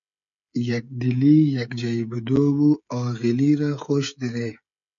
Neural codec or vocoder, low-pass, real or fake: codec, 16 kHz, 16 kbps, FreqCodec, smaller model; 7.2 kHz; fake